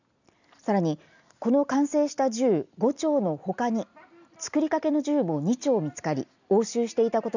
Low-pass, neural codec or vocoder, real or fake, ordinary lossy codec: 7.2 kHz; none; real; none